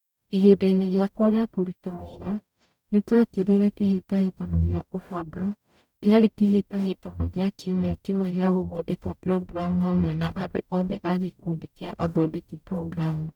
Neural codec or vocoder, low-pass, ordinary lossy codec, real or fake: codec, 44.1 kHz, 0.9 kbps, DAC; 19.8 kHz; none; fake